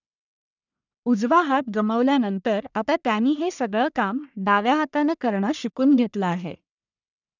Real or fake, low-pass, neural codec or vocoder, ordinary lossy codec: fake; 7.2 kHz; codec, 44.1 kHz, 1.7 kbps, Pupu-Codec; none